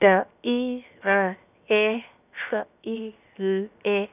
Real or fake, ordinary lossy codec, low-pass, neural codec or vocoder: fake; none; 3.6 kHz; codec, 16 kHz, 0.7 kbps, FocalCodec